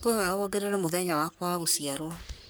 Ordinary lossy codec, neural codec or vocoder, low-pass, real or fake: none; codec, 44.1 kHz, 3.4 kbps, Pupu-Codec; none; fake